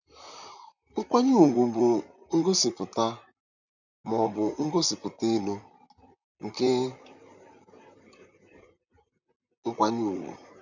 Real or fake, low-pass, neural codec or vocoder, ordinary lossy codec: fake; 7.2 kHz; vocoder, 44.1 kHz, 128 mel bands, Pupu-Vocoder; none